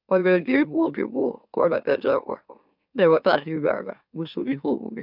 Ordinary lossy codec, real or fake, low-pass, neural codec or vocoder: none; fake; 5.4 kHz; autoencoder, 44.1 kHz, a latent of 192 numbers a frame, MeloTTS